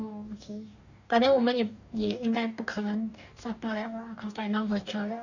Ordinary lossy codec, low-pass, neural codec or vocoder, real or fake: none; 7.2 kHz; codec, 44.1 kHz, 2.6 kbps, DAC; fake